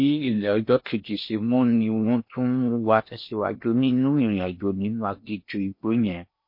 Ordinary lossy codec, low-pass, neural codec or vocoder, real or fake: MP3, 32 kbps; 5.4 kHz; codec, 16 kHz in and 24 kHz out, 0.8 kbps, FocalCodec, streaming, 65536 codes; fake